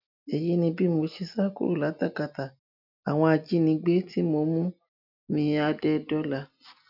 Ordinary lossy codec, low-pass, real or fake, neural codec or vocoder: none; 5.4 kHz; real; none